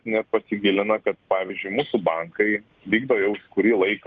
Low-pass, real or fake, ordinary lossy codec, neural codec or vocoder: 7.2 kHz; real; Opus, 32 kbps; none